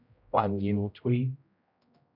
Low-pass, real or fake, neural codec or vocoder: 5.4 kHz; fake; codec, 16 kHz, 0.5 kbps, X-Codec, HuBERT features, trained on general audio